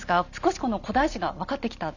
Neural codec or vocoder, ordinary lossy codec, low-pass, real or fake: none; none; 7.2 kHz; real